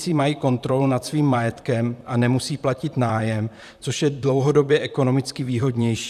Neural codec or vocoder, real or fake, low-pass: vocoder, 44.1 kHz, 128 mel bands, Pupu-Vocoder; fake; 14.4 kHz